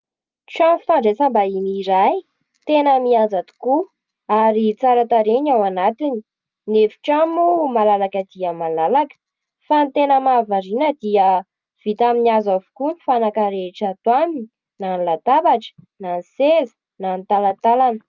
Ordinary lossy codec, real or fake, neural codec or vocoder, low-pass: Opus, 24 kbps; real; none; 7.2 kHz